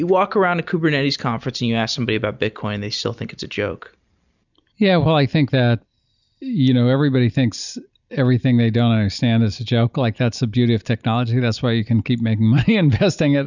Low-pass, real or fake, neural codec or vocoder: 7.2 kHz; real; none